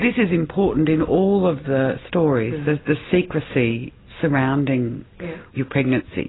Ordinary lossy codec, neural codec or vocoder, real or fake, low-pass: AAC, 16 kbps; none; real; 7.2 kHz